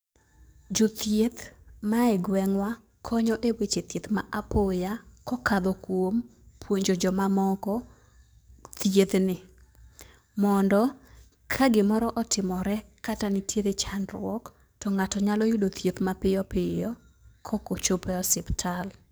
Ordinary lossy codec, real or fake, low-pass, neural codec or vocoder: none; fake; none; codec, 44.1 kHz, 7.8 kbps, DAC